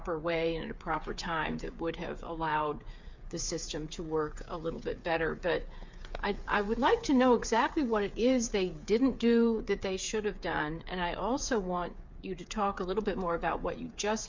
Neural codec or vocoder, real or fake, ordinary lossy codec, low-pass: codec, 16 kHz, 8 kbps, FreqCodec, smaller model; fake; MP3, 64 kbps; 7.2 kHz